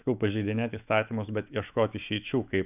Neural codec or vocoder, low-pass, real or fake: autoencoder, 48 kHz, 128 numbers a frame, DAC-VAE, trained on Japanese speech; 3.6 kHz; fake